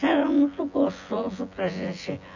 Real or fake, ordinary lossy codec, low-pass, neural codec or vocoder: fake; none; 7.2 kHz; vocoder, 24 kHz, 100 mel bands, Vocos